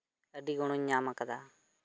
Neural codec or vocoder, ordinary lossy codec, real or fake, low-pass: none; none; real; none